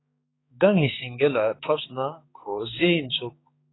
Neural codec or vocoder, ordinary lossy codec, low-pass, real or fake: codec, 16 kHz, 4 kbps, X-Codec, HuBERT features, trained on balanced general audio; AAC, 16 kbps; 7.2 kHz; fake